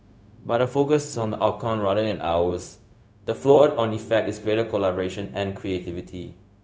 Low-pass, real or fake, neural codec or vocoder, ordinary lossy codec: none; fake; codec, 16 kHz, 0.4 kbps, LongCat-Audio-Codec; none